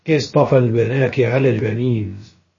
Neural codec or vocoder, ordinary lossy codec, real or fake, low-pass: codec, 16 kHz, about 1 kbps, DyCAST, with the encoder's durations; MP3, 32 kbps; fake; 7.2 kHz